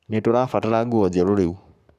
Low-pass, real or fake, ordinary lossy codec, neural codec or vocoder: 14.4 kHz; fake; none; codec, 44.1 kHz, 7.8 kbps, Pupu-Codec